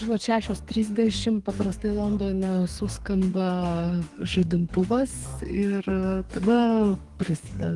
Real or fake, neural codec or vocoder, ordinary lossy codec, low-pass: fake; codec, 32 kHz, 1.9 kbps, SNAC; Opus, 24 kbps; 10.8 kHz